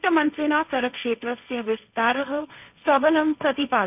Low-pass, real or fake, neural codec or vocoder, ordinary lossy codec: 3.6 kHz; fake; codec, 16 kHz, 1.1 kbps, Voila-Tokenizer; none